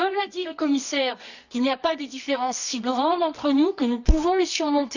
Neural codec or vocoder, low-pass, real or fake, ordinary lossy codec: codec, 24 kHz, 0.9 kbps, WavTokenizer, medium music audio release; 7.2 kHz; fake; none